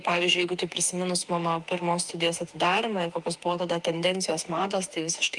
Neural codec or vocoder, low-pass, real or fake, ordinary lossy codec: autoencoder, 48 kHz, 32 numbers a frame, DAC-VAE, trained on Japanese speech; 10.8 kHz; fake; Opus, 32 kbps